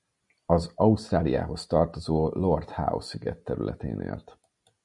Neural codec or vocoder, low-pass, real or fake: none; 10.8 kHz; real